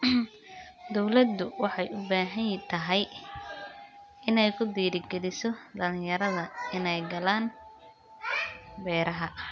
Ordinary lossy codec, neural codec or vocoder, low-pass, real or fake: none; none; none; real